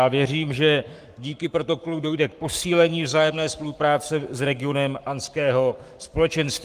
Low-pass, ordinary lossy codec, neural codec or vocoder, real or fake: 14.4 kHz; Opus, 32 kbps; codec, 44.1 kHz, 7.8 kbps, Pupu-Codec; fake